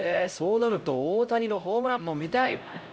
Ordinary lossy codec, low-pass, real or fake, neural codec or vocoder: none; none; fake; codec, 16 kHz, 0.5 kbps, X-Codec, HuBERT features, trained on LibriSpeech